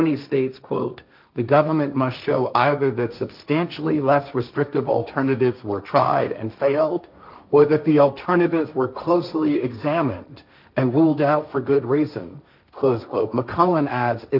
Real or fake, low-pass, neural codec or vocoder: fake; 5.4 kHz; codec, 16 kHz, 1.1 kbps, Voila-Tokenizer